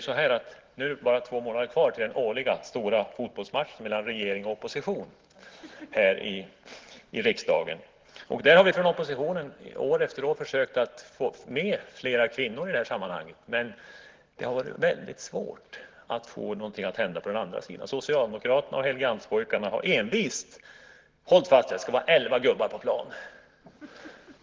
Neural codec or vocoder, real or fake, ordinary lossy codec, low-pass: none; real; Opus, 16 kbps; 7.2 kHz